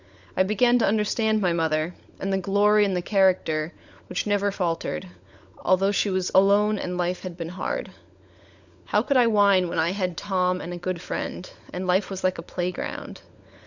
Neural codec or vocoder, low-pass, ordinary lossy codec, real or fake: codec, 16 kHz, 16 kbps, FunCodec, trained on LibriTTS, 50 frames a second; 7.2 kHz; Opus, 64 kbps; fake